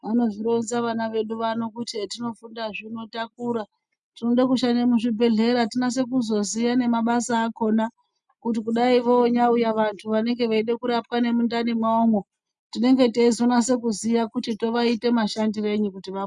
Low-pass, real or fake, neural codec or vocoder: 10.8 kHz; real; none